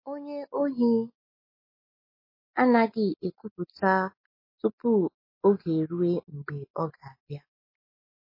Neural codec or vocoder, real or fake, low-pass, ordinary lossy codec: none; real; 5.4 kHz; MP3, 24 kbps